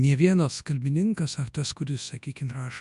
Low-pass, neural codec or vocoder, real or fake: 10.8 kHz; codec, 24 kHz, 0.9 kbps, WavTokenizer, large speech release; fake